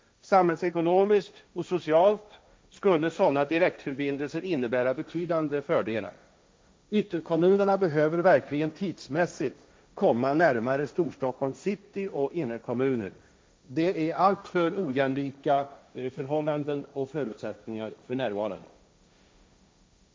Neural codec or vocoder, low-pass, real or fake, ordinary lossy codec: codec, 16 kHz, 1.1 kbps, Voila-Tokenizer; none; fake; none